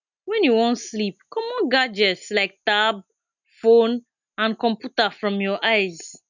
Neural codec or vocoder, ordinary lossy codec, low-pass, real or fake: none; none; 7.2 kHz; real